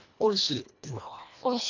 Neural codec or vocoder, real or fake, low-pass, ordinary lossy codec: codec, 24 kHz, 1.5 kbps, HILCodec; fake; 7.2 kHz; none